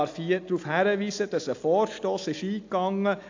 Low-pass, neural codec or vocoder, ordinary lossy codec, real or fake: 7.2 kHz; none; AAC, 48 kbps; real